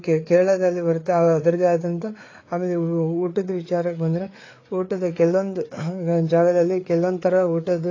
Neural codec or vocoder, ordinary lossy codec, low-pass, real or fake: codec, 16 kHz, 4 kbps, FreqCodec, larger model; AAC, 32 kbps; 7.2 kHz; fake